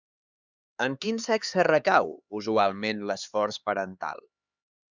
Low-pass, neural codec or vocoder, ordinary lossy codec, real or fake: 7.2 kHz; codec, 16 kHz, 4 kbps, X-Codec, HuBERT features, trained on LibriSpeech; Opus, 64 kbps; fake